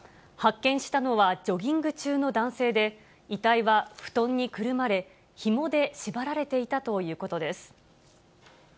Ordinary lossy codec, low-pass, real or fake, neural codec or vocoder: none; none; real; none